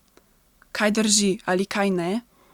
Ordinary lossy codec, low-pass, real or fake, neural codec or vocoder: Opus, 64 kbps; 19.8 kHz; real; none